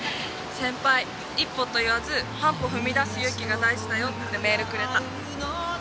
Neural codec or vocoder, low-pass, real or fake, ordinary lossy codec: none; none; real; none